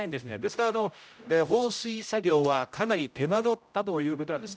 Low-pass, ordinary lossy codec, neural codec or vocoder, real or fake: none; none; codec, 16 kHz, 0.5 kbps, X-Codec, HuBERT features, trained on general audio; fake